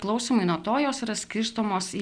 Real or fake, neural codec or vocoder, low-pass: real; none; 9.9 kHz